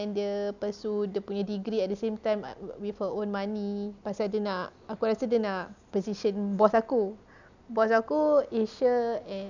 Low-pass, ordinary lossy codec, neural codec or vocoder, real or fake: 7.2 kHz; none; none; real